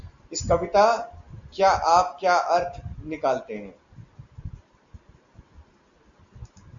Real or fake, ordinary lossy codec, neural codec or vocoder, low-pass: real; Opus, 64 kbps; none; 7.2 kHz